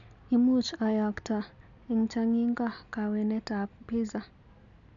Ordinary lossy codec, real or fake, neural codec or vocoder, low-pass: none; real; none; 7.2 kHz